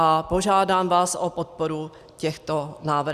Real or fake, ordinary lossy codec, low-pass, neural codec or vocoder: real; Opus, 64 kbps; 14.4 kHz; none